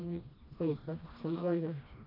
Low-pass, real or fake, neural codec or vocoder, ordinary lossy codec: 5.4 kHz; fake; codec, 16 kHz, 1 kbps, FreqCodec, smaller model; none